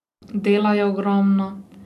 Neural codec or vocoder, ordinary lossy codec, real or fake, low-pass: none; none; real; 14.4 kHz